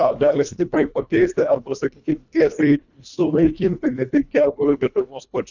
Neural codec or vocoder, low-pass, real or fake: codec, 24 kHz, 1.5 kbps, HILCodec; 7.2 kHz; fake